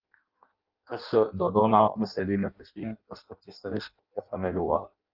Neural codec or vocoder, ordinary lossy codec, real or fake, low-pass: codec, 16 kHz in and 24 kHz out, 0.6 kbps, FireRedTTS-2 codec; Opus, 32 kbps; fake; 5.4 kHz